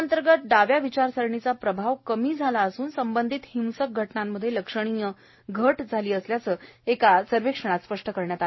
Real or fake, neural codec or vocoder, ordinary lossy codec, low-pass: real; none; MP3, 24 kbps; 7.2 kHz